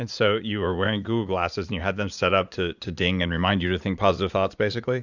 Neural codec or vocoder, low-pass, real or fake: vocoder, 44.1 kHz, 80 mel bands, Vocos; 7.2 kHz; fake